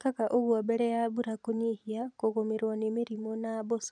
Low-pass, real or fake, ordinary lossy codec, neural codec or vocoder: 9.9 kHz; fake; none; vocoder, 44.1 kHz, 128 mel bands every 256 samples, BigVGAN v2